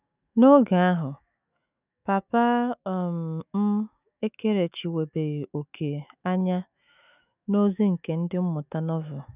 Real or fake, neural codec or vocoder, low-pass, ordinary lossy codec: fake; autoencoder, 48 kHz, 128 numbers a frame, DAC-VAE, trained on Japanese speech; 3.6 kHz; none